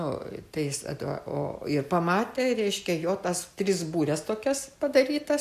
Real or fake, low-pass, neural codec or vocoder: real; 14.4 kHz; none